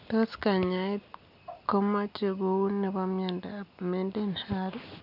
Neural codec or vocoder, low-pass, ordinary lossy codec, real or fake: none; 5.4 kHz; none; real